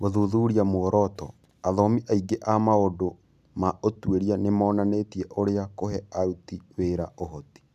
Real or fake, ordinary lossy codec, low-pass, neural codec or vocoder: real; none; 14.4 kHz; none